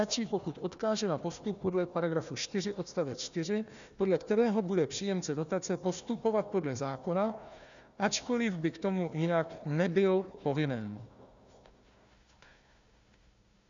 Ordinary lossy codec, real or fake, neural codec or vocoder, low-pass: MP3, 96 kbps; fake; codec, 16 kHz, 1 kbps, FunCodec, trained on Chinese and English, 50 frames a second; 7.2 kHz